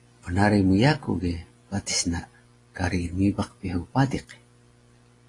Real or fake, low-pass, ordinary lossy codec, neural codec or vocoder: real; 10.8 kHz; AAC, 32 kbps; none